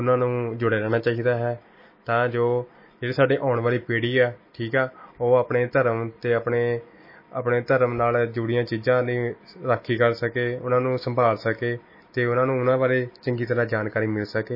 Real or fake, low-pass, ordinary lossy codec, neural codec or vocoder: real; 5.4 kHz; MP3, 24 kbps; none